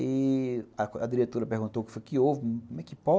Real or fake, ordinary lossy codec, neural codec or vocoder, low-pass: real; none; none; none